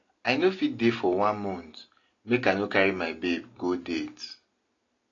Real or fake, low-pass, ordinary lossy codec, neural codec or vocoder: real; 7.2 kHz; AAC, 32 kbps; none